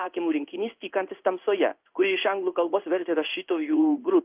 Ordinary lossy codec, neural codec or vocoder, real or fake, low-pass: Opus, 32 kbps; codec, 16 kHz in and 24 kHz out, 1 kbps, XY-Tokenizer; fake; 3.6 kHz